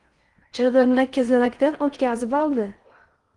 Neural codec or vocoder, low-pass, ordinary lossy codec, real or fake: codec, 16 kHz in and 24 kHz out, 0.6 kbps, FocalCodec, streaming, 4096 codes; 10.8 kHz; Opus, 24 kbps; fake